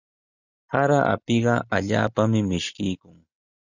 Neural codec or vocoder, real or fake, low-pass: none; real; 7.2 kHz